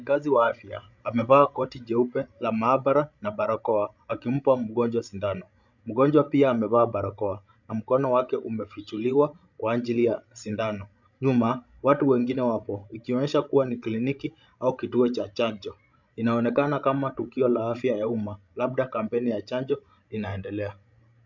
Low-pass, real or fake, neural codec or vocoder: 7.2 kHz; fake; codec, 16 kHz, 16 kbps, FreqCodec, larger model